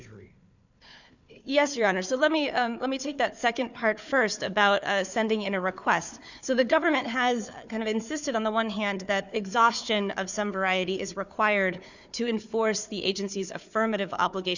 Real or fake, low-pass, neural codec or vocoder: fake; 7.2 kHz; codec, 16 kHz, 4 kbps, FunCodec, trained on Chinese and English, 50 frames a second